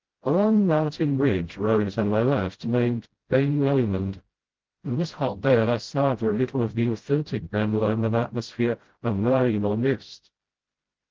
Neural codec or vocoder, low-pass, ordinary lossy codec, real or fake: codec, 16 kHz, 0.5 kbps, FreqCodec, smaller model; 7.2 kHz; Opus, 16 kbps; fake